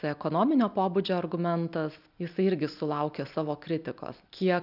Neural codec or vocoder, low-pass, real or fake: none; 5.4 kHz; real